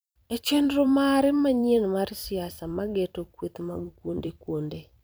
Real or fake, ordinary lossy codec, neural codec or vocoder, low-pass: fake; none; vocoder, 44.1 kHz, 128 mel bands every 256 samples, BigVGAN v2; none